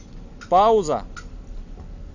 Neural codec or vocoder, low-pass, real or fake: none; 7.2 kHz; real